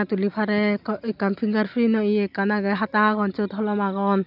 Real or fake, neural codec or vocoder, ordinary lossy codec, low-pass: real; none; none; 5.4 kHz